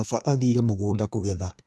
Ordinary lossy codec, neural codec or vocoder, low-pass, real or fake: none; codec, 24 kHz, 1 kbps, SNAC; none; fake